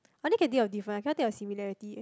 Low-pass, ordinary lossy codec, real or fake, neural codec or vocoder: none; none; real; none